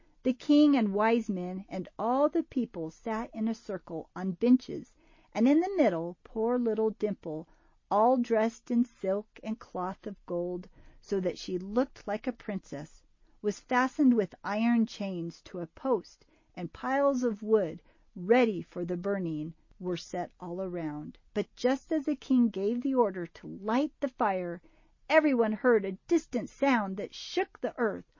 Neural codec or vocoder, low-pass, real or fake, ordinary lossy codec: none; 7.2 kHz; real; MP3, 32 kbps